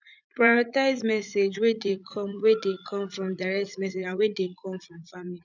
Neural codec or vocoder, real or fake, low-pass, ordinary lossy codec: vocoder, 44.1 kHz, 80 mel bands, Vocos; fake; 7.2 kHz; none